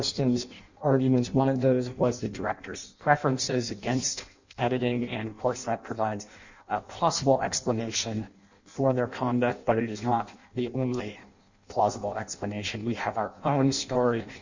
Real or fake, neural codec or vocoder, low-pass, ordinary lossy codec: fake; codec, 16 kHz in and 24 kHz out, 0.6 kbps, FireRedTTS-2 codec; 7.2 kHz; Opus, 64 kbps